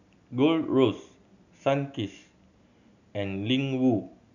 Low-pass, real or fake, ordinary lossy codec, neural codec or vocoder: 7.2 kHz; real; none; none